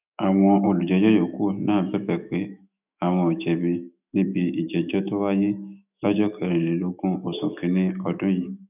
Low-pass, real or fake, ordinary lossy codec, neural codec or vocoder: 3.6 kHz; fake; none; autoencoder, 48 kHz, 128 numbers a frame, DAC-VAE, trained on Japanese speech